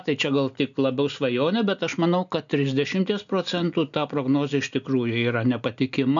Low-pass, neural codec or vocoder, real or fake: 7.2 kHz; none; real